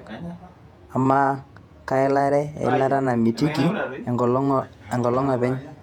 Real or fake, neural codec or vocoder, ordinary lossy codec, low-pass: fake; vocoder, 48 kHz, 128 mel bands, Vocos; none; 19.8 kHz